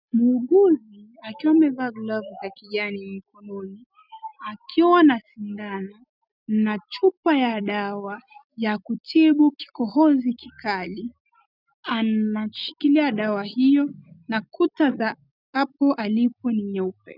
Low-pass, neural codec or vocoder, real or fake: 5.4 kHz; none; real